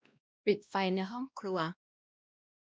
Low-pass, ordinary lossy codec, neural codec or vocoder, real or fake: none; none; codec, 16 kHz, 1 kbps, X-Codec, WavLM features, trained on Multilingual LibriSpeech; fake